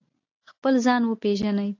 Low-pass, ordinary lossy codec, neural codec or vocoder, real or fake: 7.2 kHz; AAC, 48 kbps; none; real